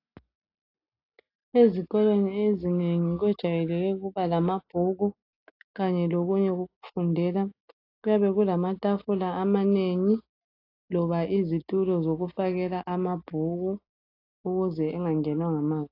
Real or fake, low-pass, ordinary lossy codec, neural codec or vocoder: real; 5.4 kHz; AAC, 32 kbps; none